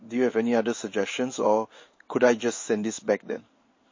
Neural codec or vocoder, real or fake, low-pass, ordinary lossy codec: codec, 16 kHz in and 24 kHz out, 1 kbps, XY-Tokenizer; fake; 7.2 kHz; MP3, 32 kbps